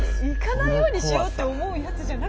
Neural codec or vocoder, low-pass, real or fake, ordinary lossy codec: none; none; real; none